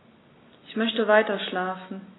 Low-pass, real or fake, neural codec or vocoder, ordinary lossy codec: 7.2 kHz; real; none; AAC, 16 kbps